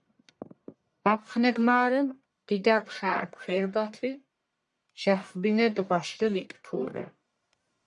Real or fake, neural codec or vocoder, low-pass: fake; codec, 44.1 kHz, 1.7 kbps, Pupu-Codec; 10.8 kHz